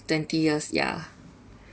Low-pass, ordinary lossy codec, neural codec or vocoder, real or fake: none; none; none; real